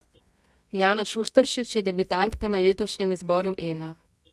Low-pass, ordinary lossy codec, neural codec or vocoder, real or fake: none; none; codec, 24 kHz, 0.9 kbps, WavTokenizer, medium music audio release; fake